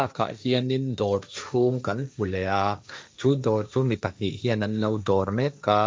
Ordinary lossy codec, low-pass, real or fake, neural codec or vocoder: none; none; fake; codec, 16 kHz, 1.1 kbps, Voila-Tokenizer